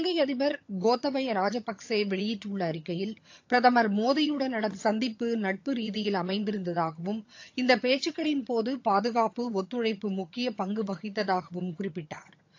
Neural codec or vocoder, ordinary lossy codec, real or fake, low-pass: vocoder, 22.05 kHz, 80 mel bands, HiFi-GAN; AAC, 48 kbps; fake; 7.2 kHz